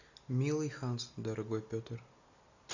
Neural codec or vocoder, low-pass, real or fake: none; 7.2 kHz; real